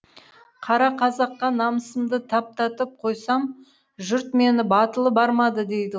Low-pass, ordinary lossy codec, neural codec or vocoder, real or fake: none; none; none; real